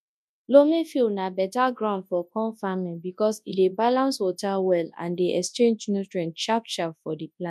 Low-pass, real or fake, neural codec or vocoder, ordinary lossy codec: none; fake; codec, 24 kHz, 0.9 kbps, WavTokenizer, large speech release; none